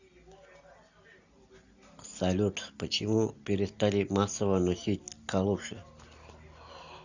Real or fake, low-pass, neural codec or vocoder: real; 7.2 kHz; none